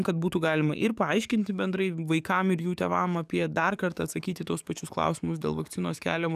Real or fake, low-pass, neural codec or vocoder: fake; 14.4 kHz; codec, 44.1 kHz, 7.8 kbps, DAC